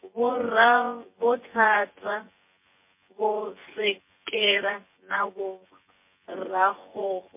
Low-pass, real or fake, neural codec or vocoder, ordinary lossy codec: 3.6 kHz; fake; vocoder, 24 kHz, 100 mel bands, Vocos; MP3, 24 kbps